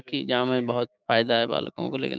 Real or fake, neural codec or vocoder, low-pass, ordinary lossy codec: fake; codec, 16 kHz, 6 kbps, DAC; none; none